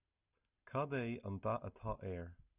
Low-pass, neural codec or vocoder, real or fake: 3.6 kHz; none; real